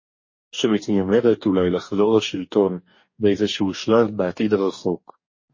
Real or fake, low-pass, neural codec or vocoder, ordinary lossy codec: fake; 7.2 kHz; codec, 44.1 kHz, 2.6 kbps, DAC; MP3, 32 kbps